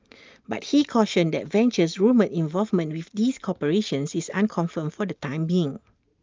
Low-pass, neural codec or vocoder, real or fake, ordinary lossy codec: 7.2 kHz; none; real; Opus, 24 kbps